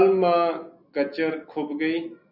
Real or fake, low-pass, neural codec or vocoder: real; 5.4 kHz; none